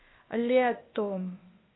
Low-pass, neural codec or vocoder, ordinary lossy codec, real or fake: 7.2 kHz; codec, 16 kHz, 0.8 kbps, ZipCodec; AAC, 16 kbps; fake